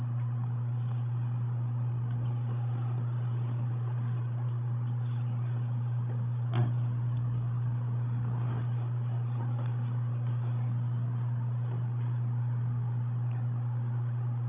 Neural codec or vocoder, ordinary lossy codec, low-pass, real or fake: codec, 16 kHz, 16 kbps, FreqCodec, larger model; none; 3.6 kHz; fake